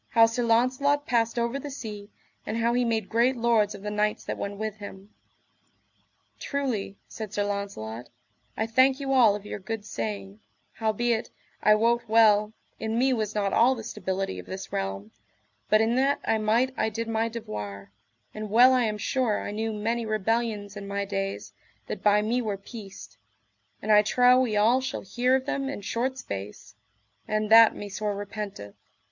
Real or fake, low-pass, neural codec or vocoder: real; 7.2 kHz; none